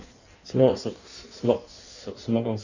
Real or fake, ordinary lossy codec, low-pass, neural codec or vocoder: fake; none; 7.2 kHz; codec, 16 kHz in and 24 kHz out, 1.1 kbps, FireRedTTS-2 codec